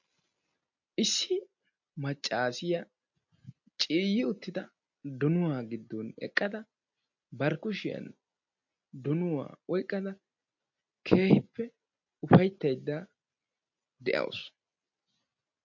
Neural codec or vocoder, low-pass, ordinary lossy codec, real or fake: none; 7.2 kHz; MP3, 48 kbps; real